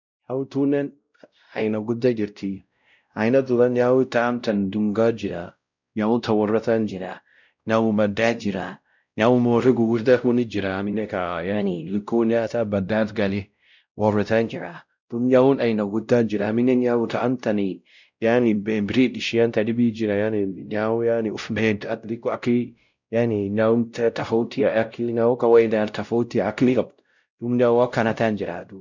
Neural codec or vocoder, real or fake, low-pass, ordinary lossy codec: codec, 16 kHz, 0.5 kbps, X-Codec, WavLM features, trained on Multilingual LibriSpeech; fake; 7.2 kHz; none